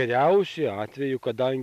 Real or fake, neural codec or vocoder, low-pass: real; none; 14.4 kHz